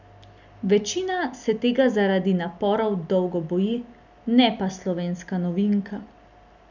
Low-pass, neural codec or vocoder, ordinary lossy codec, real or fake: 7.2 kHz; none; none; real